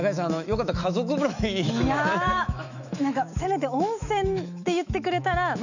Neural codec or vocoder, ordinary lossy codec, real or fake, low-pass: autoencoder, 48 kHz, 128 numbers a frame, DAC-VAE, trained on Japanese speech; none; fake; 7.2 kHz